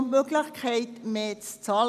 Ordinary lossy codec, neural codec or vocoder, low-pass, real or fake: none; none; 14.4 kHz; real